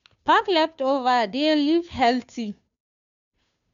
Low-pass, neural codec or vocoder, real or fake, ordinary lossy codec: 7.2 kHz; codec, 16 kHz, 2 kbps, FunCodec, trained on Chinese and English, 25 frames a second; fake; none